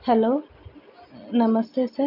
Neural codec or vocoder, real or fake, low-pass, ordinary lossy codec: none; real; 5.4 kHz; none